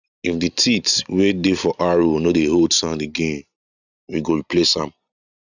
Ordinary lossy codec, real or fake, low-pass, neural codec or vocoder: none; real; 7.2 kHz; none